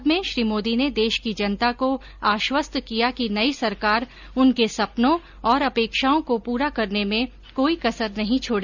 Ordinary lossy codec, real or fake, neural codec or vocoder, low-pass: none; real; none; 7.2 kHz